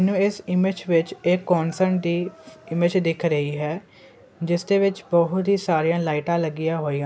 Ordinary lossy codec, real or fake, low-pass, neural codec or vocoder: none; real; none; none